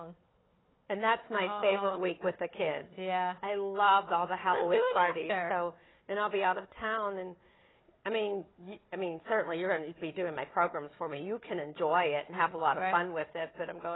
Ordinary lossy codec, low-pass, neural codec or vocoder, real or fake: AAC, 16 kbps; 7.2 kHz; codec, 16 kHz, 4 kbps, FunCodec, trained on Chinese and English, 50 frames a second; fake